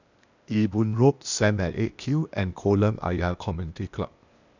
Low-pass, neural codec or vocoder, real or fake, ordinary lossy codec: 7.2 kHz; codec, 16 kHz, 0.8 kbps, ZipCodec; fake; none